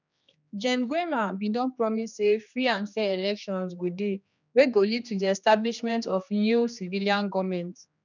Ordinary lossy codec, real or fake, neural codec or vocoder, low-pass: none; fake; codec, 16 kHz, 2 kbps, X-Codec, HuBERT features, trained on general audio; 7.2 kHz